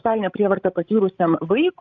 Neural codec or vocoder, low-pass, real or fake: codec, 16 kHz, 16 kbps, FreqCodec, larger model; 7.2 kHz; fake